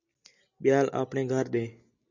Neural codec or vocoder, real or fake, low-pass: none; real; 7.2 kHz